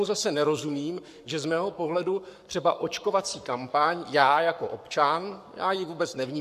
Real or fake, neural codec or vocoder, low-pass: fake; codec, 44.1 kHz, 7.8 kbps, Pupu-Codec; 14.4 kHz